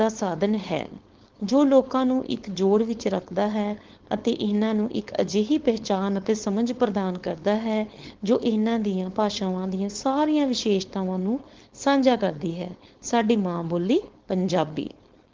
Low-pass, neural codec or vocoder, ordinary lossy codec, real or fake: 7.2 kHz; codec, 16 kHz, 4.8 kbps, FACodec; Opus, 16 kbps; fake